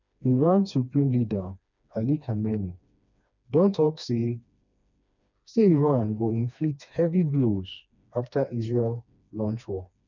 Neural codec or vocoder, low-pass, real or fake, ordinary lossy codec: codec, 16 kHz, 2 kbps, FreqCodec, smaller model; 7.2 kHz; fake; none